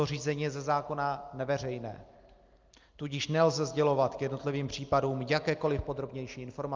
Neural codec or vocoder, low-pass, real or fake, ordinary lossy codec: none; 7.2 kHz; real; Opus, 24 kbps